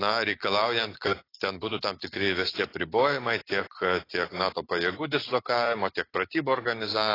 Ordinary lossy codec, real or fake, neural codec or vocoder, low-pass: AAC, 24 kbps; real; none; 5.4 kHz